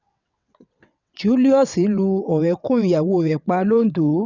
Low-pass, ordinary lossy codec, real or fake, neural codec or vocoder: 7.2 kHz; none; fake; vocoder, 22.05 kHz, 80 mel bands, WaveNeXt